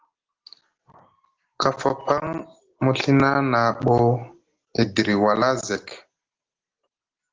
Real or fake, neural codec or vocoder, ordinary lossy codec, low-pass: real; none; Opus, 16 kbps; 7.2 kHz